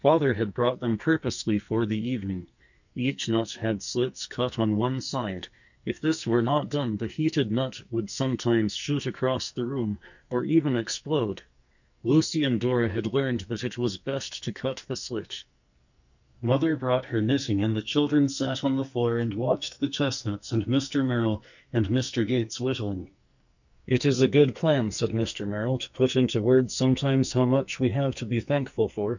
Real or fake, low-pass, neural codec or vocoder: fake; 7.2 kHz; codec, 44.1 kHz, 2.6 kbps, SNAC